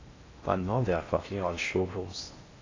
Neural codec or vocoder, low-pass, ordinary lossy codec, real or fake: codec, 16 kHz in and 24 kHz out, 0.6 kbps, FocalCodec, streaming, 4096 codes; 7.2 kHz; AAC, 32 kbps; fake